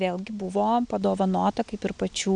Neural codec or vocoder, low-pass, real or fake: none; 9.9 kHz; real